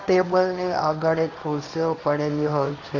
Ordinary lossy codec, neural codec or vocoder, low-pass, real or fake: none; codec, 16 kHz, 1.1 kbps, Voila-Tokenizer; 7.2 kHz; fake